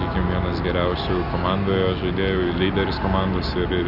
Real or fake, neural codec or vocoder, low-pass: real; none; 5.4 kHz